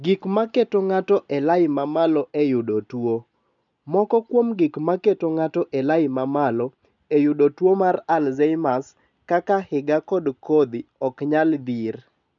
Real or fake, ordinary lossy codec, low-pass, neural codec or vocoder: real; MP3, 96 kbps; 7.2 kHz; none